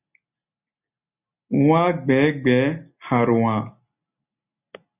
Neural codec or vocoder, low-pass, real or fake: none; 3.6 kHz; real